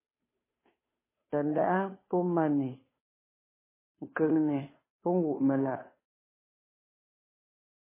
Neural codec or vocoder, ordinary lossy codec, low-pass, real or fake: codec, 16 kHz, 2 kbps, FunCodec, trained on Chinese and English, 25 frames a second; MP3, 16 kbps; 3.6 kHz; fake